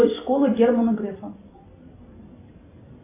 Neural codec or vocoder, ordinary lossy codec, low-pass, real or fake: vocoder, 44.1 kHz, 128 mel bands every 256 samples, BigVGAN v2; AAC, 24 kbps; 3.6 kHz; fake